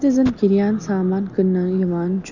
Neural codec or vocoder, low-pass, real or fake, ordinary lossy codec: none; 7.2 kHz; real; none